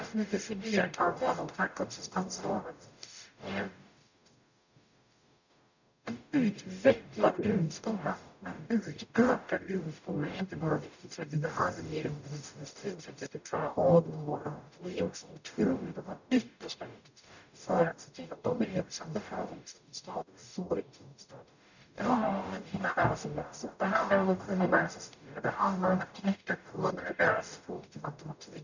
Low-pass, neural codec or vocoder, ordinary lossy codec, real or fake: 7.2 kHz; codec, 44.1 kHz, 0.9 kbps, DAC; none; fake